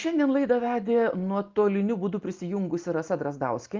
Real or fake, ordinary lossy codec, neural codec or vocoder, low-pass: real; Opus, 24 kbps; none; 7.2 kHz